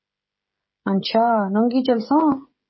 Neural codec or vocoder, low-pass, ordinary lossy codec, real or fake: codec, 16 kHz, 16 kbps, FreqCodec, smaller model; 7.2 kHz; MP3, 24 kbps; fake